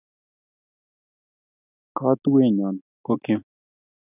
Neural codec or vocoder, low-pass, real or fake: none; 3.6 kHz; real